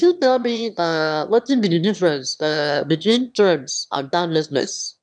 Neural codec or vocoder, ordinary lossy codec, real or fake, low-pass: autoencoder, 22.05 kHz, a latent of 192 numbers a frame, VITS, trained on one speaker; none; fake; 9.9 kHz